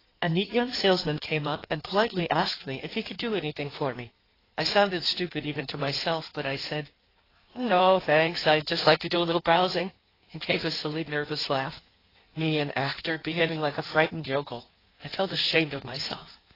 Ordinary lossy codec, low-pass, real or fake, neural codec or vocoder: AAC, 24 kbps; 5.4 kHz; fake; codec, 16 kHz in and 24 kHz out, 1.1 kbps, FireRedTTS-2 codec